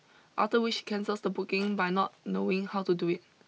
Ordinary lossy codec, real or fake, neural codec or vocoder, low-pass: none; real; none; none